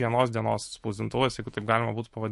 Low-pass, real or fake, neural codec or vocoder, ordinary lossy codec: 14.4 kHz; fake; autoencoder, 48 kHz, 128 numbers a frame, DAC-VAE, trained on Japanese speech; MP3, 48 kbps